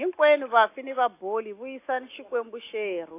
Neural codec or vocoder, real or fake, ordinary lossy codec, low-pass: none; real; AAC, 24 kbps; 3.6 kHz